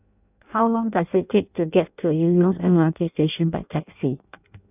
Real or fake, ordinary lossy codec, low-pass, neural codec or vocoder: fake; none; 3.6 kHz; codec, 16 kHz in and 24 kHz out, 0.6 kbps, FireRedTTS-2 codec